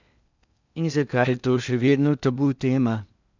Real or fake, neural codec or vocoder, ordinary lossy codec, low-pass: fake; codec, 16 kHz in and 24 kHz out, 0.8 kbps, FocalCodec, streaming, 65536 codes; none; 7.2 kHz